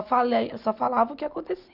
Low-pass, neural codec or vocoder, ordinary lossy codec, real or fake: 5.4 kHz; none; none; real